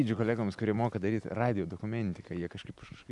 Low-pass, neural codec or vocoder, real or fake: 10.8 kHz; none; real